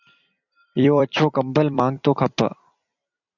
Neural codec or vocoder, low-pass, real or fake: vocoder, 44.1 kHz, 128 mel bands every 256 samples, BigVGAN v2; 7.2 kHz; fake